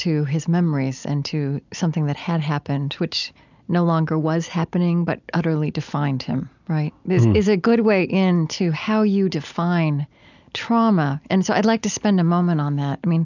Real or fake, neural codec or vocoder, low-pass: real; none; 7.2 kHz